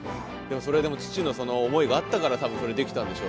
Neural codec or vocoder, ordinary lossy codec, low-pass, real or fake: none; none; none; real